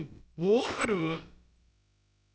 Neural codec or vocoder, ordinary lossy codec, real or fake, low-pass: codec, 16 kHz, about 1 kbps, DyCAST, with the encoder's durations; none; fake; none